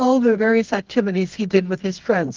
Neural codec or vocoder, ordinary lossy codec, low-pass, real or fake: codec, 24 kHz, 0.9 kbps, WavTokenizer, medium music audio release; Opus, 24 kbps; 7.2 kHz; fake